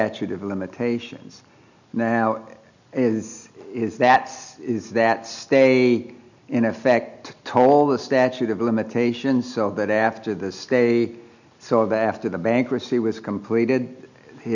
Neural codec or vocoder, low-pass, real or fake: none; 7.2 kHz; real